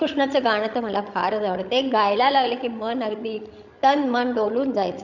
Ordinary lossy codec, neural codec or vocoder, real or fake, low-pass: none; codec, 16 kHz, 16 kbps, FreqCodec, larger model; fake; 7.2 kHz